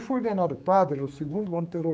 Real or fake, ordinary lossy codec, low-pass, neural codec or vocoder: fake; none; none; codec, 16 kHz, 4 kbps, X-Codec, HuBERT features, trained on general audio